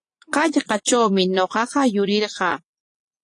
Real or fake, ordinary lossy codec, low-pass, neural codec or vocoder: fake; AAC, 48 kbps; 10.8 kHz; vocoder, 24 kHz, 100 mel bands, Vocos